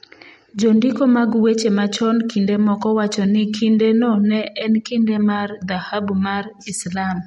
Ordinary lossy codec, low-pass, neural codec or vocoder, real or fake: MP3, 48 kbps; 19.8 kHz; none; real